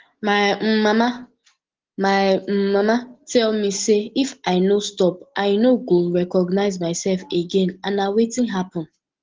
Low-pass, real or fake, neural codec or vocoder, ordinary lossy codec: 7.2 kHz; real; none; Opus, 16 kbps